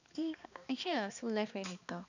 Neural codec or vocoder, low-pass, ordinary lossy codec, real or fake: codec, 16 kHz, 2 kbps, X-Codec, HuBERT features, trained on balanced general audio; 7.2 kHz; none; fake